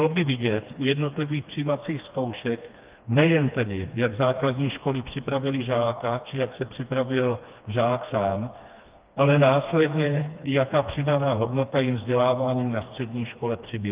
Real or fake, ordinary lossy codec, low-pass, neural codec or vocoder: fake; Opus, 24 kbps; 3.6 kHz; codec, 16 kHz, 2 kbps, FreqCodec, smaller model